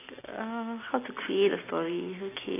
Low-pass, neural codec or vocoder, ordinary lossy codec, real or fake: 3.6 kHz; none; AAC, 16 kbps; real